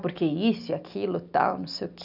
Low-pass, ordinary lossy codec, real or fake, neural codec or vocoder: 5.4 kHz; none; real; none